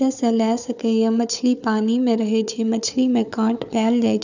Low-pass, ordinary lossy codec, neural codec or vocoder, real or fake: 7.2 kHz; none; codec, 16 kHz, 4 kbps, FunCodec, trained on Chinese and English, 50 frames a second; fake